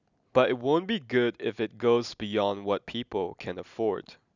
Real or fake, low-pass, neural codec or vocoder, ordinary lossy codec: real; 7.2 kHz; none; none